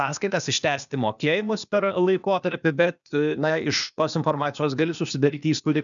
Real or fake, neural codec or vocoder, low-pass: fake; codec, 16 kHz, 0.8 kbps, ZipCodec; 7.2 kHz